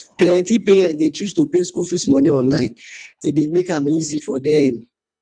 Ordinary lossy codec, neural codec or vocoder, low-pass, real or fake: none; codec, 24 kHz, 1.5 kbps, HILCodec; 9.9 kHz; fake